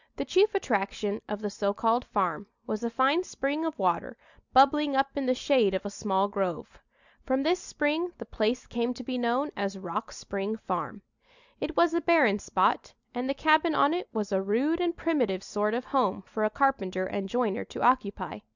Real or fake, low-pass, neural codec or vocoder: real; 7.2 kHz; none